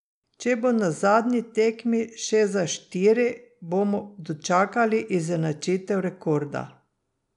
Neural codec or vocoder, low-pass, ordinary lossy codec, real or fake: none; 10.8 kHz; none; real